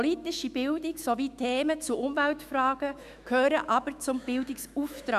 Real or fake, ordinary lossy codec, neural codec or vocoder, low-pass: fake; none; autoencoder, 48 kHz, 128 numbers a frame, DAC-VAE, trained on Japanese speech; 14.4 kHz